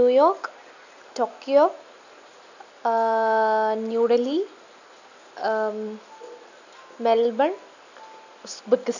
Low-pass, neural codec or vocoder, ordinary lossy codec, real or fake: 7.2 kHz; none; none; real